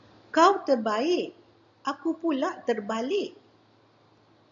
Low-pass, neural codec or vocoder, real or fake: 7.2 kHz; none; real